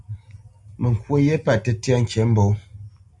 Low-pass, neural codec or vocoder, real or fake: 10.8 kHz; none; real